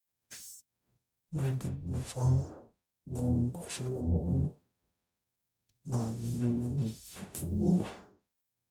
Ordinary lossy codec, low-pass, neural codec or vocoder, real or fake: none; none; codec, 44.1 kHz, 0.9 kbps, DAC; fake